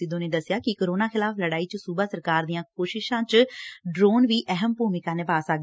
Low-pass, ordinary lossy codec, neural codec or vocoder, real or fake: none; none; none; real